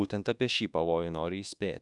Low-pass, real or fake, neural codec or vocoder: 10.8 kHz; fake; codec, 24 kHz, 1.2 kbps, DualCodec